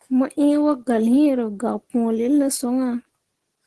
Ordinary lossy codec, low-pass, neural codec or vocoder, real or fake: Opus, 16 kbps; 10.8 kHz; none; real